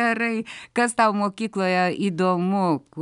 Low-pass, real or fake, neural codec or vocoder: 10.8 kHz; real; none